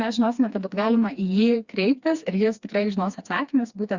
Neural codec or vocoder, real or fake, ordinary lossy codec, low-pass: codec, 16 kHz, 2 kbps, FreqCodec, smaller model; fake; Opus, 64 kbps; 7.2 kHz